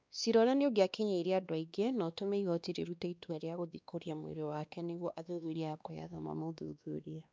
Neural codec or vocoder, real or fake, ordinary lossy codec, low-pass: codec, 16 kHz, 2 kbps, X-Codec, WavLM features, trained on Multilingual LibriSpeech; fake; none; none